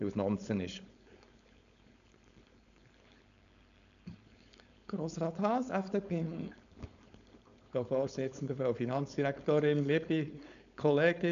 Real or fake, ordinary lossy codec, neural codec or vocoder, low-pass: fake; none; codec, 16 kHz, 4.8 kbps, FACodec; 7.2 kHz